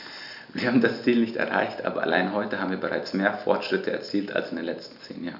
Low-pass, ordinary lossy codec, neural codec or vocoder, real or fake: 5.4 kHz; none; none; real